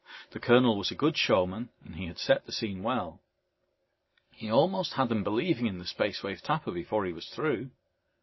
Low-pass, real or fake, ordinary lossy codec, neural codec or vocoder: 7.2 kHz; real; MP3, 24 kbps; none